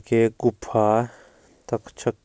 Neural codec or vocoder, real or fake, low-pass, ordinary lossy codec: none; real; none; none